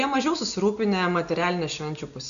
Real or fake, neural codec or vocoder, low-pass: real; none; 7.2 kHz